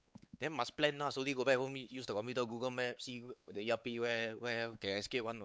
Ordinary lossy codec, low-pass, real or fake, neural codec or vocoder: none; none; fake; codec, 16 kHz, 4 kbps, X-Codec, WavLM features, trained on Multilingual LibriSpeech